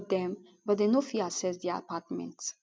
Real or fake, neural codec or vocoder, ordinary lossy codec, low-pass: real; none; none; none